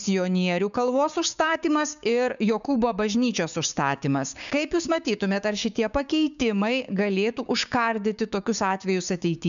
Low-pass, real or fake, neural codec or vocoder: 7.2 kHz; fake; codec, 16 kHz, 6 kbps, DAC